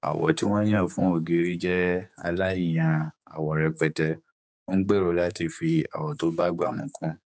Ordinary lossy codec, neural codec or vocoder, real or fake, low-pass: none; codec, 16 kHz, 4 kbps, X-Codec, HuBERT features, trained on general audio; fake; none